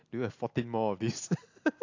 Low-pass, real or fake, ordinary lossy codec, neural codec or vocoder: 7.2 kHz; real; AAC, 48 kbps; none